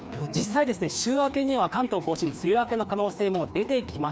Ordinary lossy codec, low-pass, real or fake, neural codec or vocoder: none; none; fake; codec, 16 kHz, 2 kbps, FreqCodec, larger model